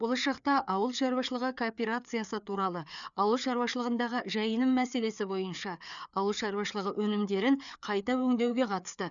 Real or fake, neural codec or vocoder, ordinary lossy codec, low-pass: fake; codec, 16 kHz, 4 kbps, FreqCodec, larger model; none; 7.2 kHz